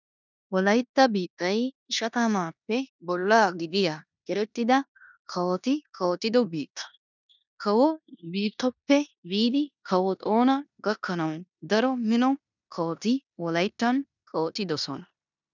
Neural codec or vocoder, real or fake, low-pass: codec, 16 kHz in and 24 kHz out, 0.9 kbps, LongCat-Audio-Codec, four codebook decoder; fake; 7.2 kHz